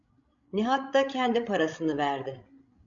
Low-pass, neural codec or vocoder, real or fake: 7.2 kHz; codec, 16 kHz, 16 kbps, FreqCodec, larger model; fake